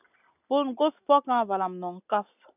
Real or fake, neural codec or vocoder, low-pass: real; none; 3.6 kHz